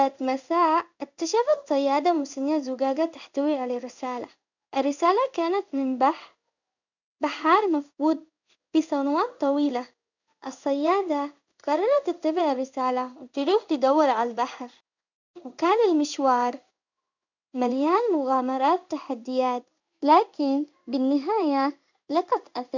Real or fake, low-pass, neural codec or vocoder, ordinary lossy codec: fake; 7.2 kHz; codec, 16 kHz in and 24 kHz out, 1 kbps, XY-Tokenizer; none